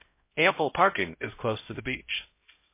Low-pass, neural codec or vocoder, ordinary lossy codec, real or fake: 3.6 kHz; codec, 16 kHz, 0.5 kbps, X-Codec, HuBERT features, trained on LibriSpeech; MP3, 24 kbps; fake